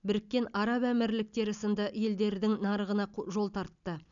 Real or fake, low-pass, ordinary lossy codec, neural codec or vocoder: real; 7.2 kHz; none; none